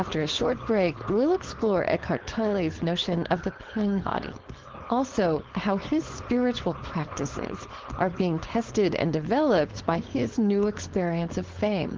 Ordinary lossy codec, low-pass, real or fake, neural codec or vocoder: Opus, 16 kbps; 7.2 kHz; fake; codec, 16 kHz, 4.8 kbps, FACodec